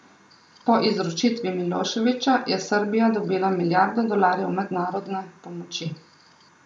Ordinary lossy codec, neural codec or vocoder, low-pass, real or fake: none; none; 9.9 kHz; real